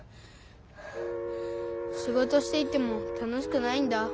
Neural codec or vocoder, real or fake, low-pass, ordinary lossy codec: none; real; none; none